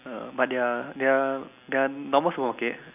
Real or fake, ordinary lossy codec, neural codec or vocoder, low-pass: real; none; none; 3.6 kHz